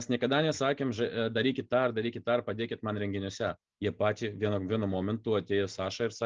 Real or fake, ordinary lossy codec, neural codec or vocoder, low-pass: real; Opus, 24 kbps; none; 7.2 kHz